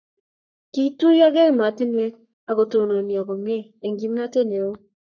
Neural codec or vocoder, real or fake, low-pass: codec, 44.1 kHz, 3.4 kbps, Pupu-Codec; fake; 7.2 kHz